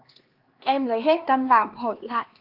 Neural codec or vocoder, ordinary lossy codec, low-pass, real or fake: codec, 16 kHz, 2 kbps, X-Codec, HuBERT features, trained on LibriSpeech; Opus, 32 kbps; 5.4 kHz; fake